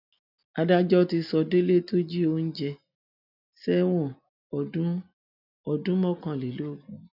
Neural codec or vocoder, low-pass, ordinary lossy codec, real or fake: none; 5.4 kHz; none; real